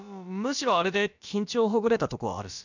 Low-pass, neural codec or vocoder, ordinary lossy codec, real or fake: 7.2 kHz; codec, 16 kHz, about 1 kbps, DyCAST, with the encoder's durations; none; fake